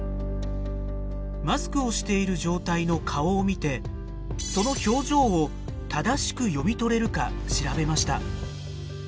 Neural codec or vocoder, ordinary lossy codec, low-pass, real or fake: none; none; none; real